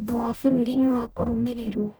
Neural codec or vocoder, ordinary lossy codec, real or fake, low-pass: codec, 44.1 kHz, 0.9 kbps, DAC; none; fake; none